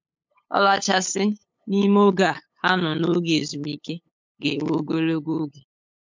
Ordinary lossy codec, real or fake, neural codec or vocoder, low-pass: MP3, 64 kbps; fake; codec, 16 kHz, 8 kbps, FunCodec, trained on LibriTTS, 25 frames a second; 7.2 kHz